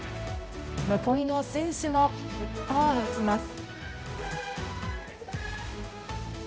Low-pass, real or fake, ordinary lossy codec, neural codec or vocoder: none; fake; none; codec, 16 kHz, 0.5 kbps, X-Codec, HuBERT features, trained on balanced general audio